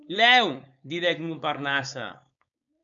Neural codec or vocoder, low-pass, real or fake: codec, 16 kHz, 4.8 kbps, FACodec; 7.2 kHz; fake